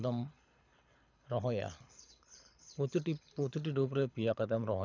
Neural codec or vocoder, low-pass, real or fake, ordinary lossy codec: codec, 24 kHz, 6 kbps, HILCodec; 7.2 kHz; fake; none